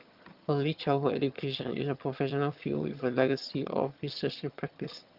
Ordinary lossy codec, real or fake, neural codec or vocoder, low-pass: Opus, 32 kbps; fake; vocoder, 22.05 kHz, 80 mel bands, HiFi-GAN; 5.4 kHz